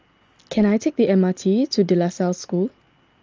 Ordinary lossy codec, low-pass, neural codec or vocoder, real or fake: Opus, 24 kbps; 7.2 kHz; none; real